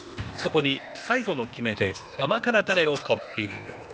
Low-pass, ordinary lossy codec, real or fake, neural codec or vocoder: none; none; fake; codec, 16 kHz, 0.8 kbps, ZipCodec